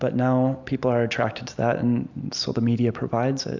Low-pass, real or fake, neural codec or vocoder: 7.2 kHz; real; none